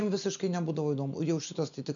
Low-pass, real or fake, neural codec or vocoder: 7.2 kHz; real; none